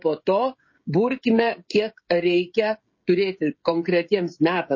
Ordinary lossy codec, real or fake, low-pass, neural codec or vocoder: MP3, 32 kbps; fake; 7.2 kHz; codec, 16 kHz, 16 kbps, FreqCodec, smaller model